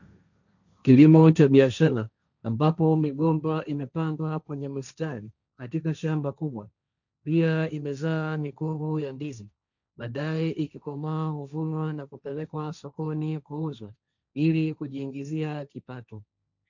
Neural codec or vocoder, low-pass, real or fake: codec, 16 kHz, 1.1 kbps, Voila-Tokenizer; 7.2 kHz; fake